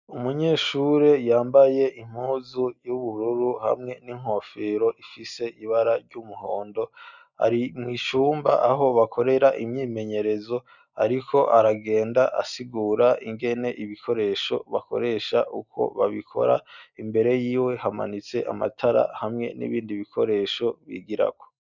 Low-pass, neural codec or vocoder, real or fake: 7.2 kHz; none; real